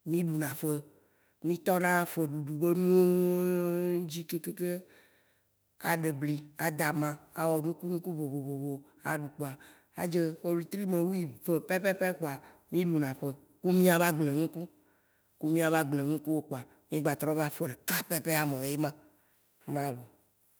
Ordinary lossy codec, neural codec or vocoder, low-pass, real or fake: none; autoencoder, 48 kHz, 32 numbers a frame, DAC-VAE, trained on Japanese speech; none; fake